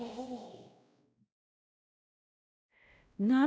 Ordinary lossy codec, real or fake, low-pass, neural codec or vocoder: none; fake; none; codec, 16 kHz, 0.5 kbps, X-Codec, WavLM features, trained on Multilingual LibriSpeech